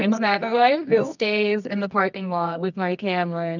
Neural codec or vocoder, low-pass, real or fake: codec, 24 kHz, 0.9 kbps, WavTokenizer, medium music audio release; 7.2 kHz; fake